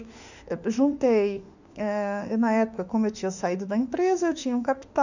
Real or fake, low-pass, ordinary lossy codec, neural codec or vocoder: fake; 7.2 kHz; none; autoencoder, 48 kHz, 32 numbers a frame, DAC-VAE, trained on Japanese speech